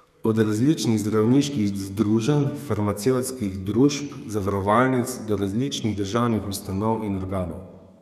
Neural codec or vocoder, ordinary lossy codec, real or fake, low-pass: codec, 32 kHz, 1.9 kbps, SNAC; none; fake; 14.4 kHz